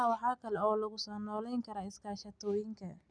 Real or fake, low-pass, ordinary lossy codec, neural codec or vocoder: real; 9.9 kHz; none; none